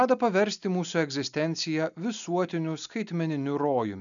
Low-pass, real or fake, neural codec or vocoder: 7.2 kHz; real; none